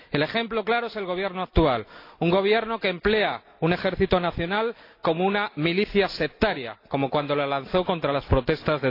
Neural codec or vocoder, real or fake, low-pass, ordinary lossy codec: none; real; 5.4 kHz; AAC, 32 kbps